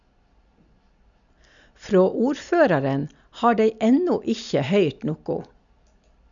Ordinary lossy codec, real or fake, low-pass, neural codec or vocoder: none; real; 7.2 kHz; none